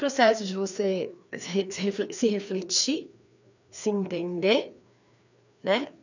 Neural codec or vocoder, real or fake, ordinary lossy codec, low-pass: codec, 16 kHz, 2 kbps, FreqCodec, larger model; fake; none; 7.2 kHz